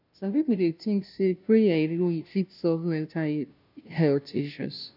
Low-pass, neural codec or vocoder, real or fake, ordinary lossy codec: 5.4 kHz; codec, 16 kHz, 0.5 kbps, FunCodec, trained on Chinese and English, 25 frames a second; fake; none